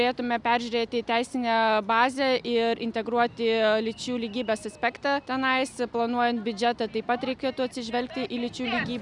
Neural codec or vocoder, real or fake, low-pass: none; real; 10.8 kHz